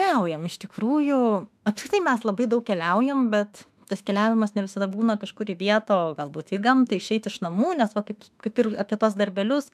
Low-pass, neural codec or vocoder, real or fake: 14.4 kHz; autoencoder, 48 kHz, 32 numbers a frame, DAC-VAE, trained on Japanese speech; fake